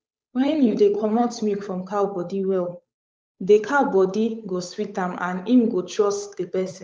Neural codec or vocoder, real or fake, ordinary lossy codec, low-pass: codec, 16 kHz, 8 kbps, FunCodec, trained on Chinese and English, 25 frames a second; fake; none; none